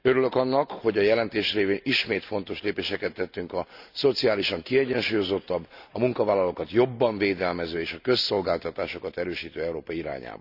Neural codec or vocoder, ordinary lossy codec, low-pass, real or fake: none; none; 5.4 kHz; real